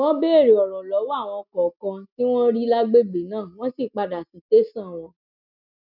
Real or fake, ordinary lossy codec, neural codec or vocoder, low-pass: real; none; none; 5.4 kHz